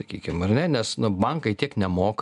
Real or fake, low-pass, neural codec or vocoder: real; 10.8 kHz; none